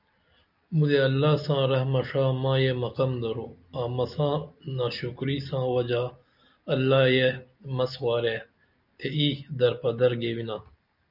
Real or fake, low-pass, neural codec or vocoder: real; 5.4 kHz; none